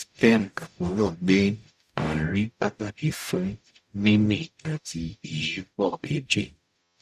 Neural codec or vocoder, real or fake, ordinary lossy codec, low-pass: codec, 44.1 kHz, 0.9 kbps, DAC; fake; none; 14.4 kHz